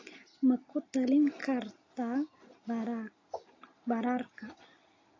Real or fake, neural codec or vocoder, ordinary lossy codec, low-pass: real; none; AAC, 32 kbps; 7.2 kHz